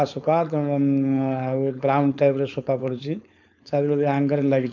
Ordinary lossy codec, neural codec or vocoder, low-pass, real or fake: none; codec, 16 kHz, 4.8 kbps, FACodec; 7.2 kHz; fake